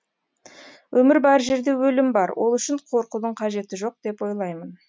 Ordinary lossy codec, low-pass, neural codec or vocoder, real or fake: none; none; none; real